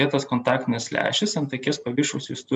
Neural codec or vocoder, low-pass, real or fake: none; 9.9 kHz; real